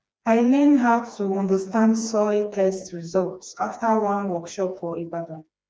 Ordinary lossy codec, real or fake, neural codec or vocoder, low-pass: none; fake; codec, 16 kHz, 2 kbps, FreqCodec, smaller model; none